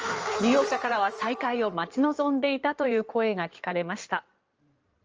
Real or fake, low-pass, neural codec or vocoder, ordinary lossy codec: fake; 7.2 kHz; codec, 16 kHz in and 24 kHz out, 2.2 kbps, FireRedTTS-2 codec; Opus, 24 kbps